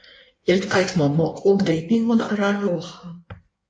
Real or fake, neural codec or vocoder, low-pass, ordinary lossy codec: fake; codec, 16 kHz in and 24 kHz out, 1.1 kbps, FireRedTTS-2 codec; 9.9 kHz; MP3, 48 kbps